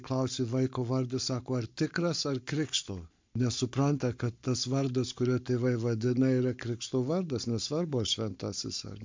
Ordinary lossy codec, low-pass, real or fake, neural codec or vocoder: MP3, 64 kbps; 7.2 kHz; fake; codec, 44.1 kHz, 7.8 kbps, Pupu-Codec